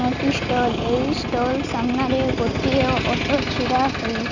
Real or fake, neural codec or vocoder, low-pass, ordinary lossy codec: real; none; 7.2 kHz; none